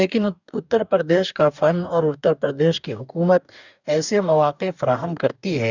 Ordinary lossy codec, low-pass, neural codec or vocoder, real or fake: none; 7.2 kHz; codec, 44.1 kHz, 2.6 kbps, DAC; fake